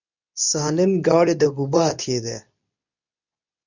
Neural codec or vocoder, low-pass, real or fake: codec, 24 kHz, 0.9 kbps, WavTokenizer, medium speech release version 2; 7.2 kHz; fake